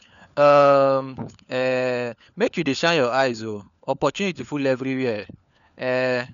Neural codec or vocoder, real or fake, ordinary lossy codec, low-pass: codec, 16 kHz, 4 kbps, FunCodec, trained on LibriTTS, 50 frames a second; fake; none; 7.2 kHz